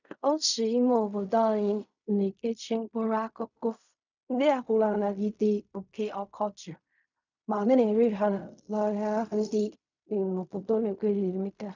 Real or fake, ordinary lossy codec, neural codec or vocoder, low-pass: fake; none; codec, 16 kHz in and 24 kHz out, 0.4 kbps, LongCat-Audio-Codec, fine tuned four codebook decoder; 7.2 kHz